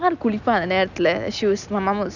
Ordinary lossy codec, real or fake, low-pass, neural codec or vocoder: none; real; 7.2 kHz; none